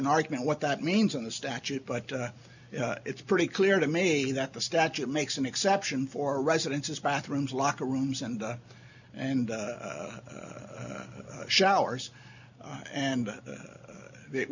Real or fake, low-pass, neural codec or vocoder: real; 7.2 kHz; none